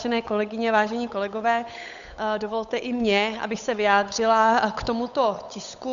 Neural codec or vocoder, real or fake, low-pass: codec, 16 kHz, 8 kbps, FunCodec, trained on Chinese and English, 25 frames a second; fake; 7.2 kHz